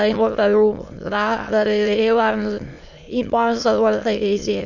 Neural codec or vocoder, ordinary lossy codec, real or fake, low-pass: autoencoder, 22.05 kHz, a latent of 192 numbers a frame, VITS, trained on many speakers; none; fake; 7.2 kHz